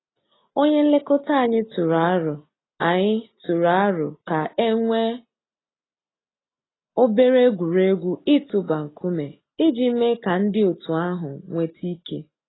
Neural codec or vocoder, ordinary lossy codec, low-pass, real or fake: none; AAC, 16 kbps; 7.2 kHz; real